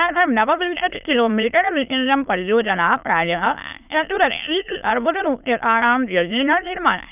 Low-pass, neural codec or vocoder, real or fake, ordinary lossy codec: 3.6 kHz; autoencoder, 22.05 kHz, a latent of 192 numbers a frame, VITS, trained on many speakers; fake; none